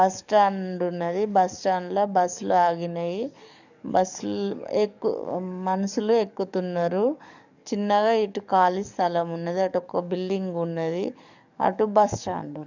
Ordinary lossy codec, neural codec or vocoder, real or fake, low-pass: none; codec, 44.1 kHz, 7.8 kbps, DAC; fake; 7.2 kHz